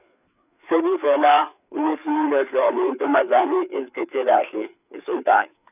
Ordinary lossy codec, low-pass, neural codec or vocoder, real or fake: none; 3.6 kHz; codec, 16 kHz, 4 kbps, FreqCodec, larger model; fake